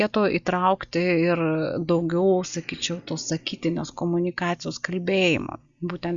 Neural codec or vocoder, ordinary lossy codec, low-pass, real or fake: none; Opus, 64 kbps; 7.2 kHz; real